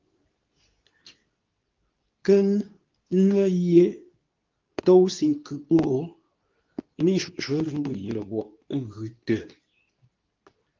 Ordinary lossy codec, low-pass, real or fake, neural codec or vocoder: Opus, 32 kbps; 7.2 kHz; fake; codec, 24 kHz, 0.9 kbps, WavTokenizer, medium speech release version 2